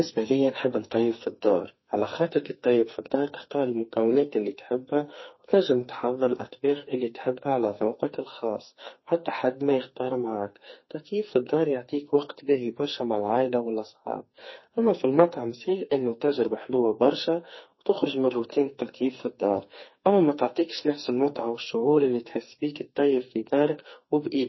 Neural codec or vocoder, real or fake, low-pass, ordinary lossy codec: codec, 44.1 kHz, 2.6 kbps, SNAC; fake; 7.2 kHz; MP3, 24 kbps